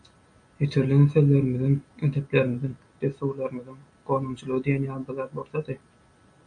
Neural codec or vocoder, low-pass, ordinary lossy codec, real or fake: none; 9.9 kHz; MP3, 64 kbps; real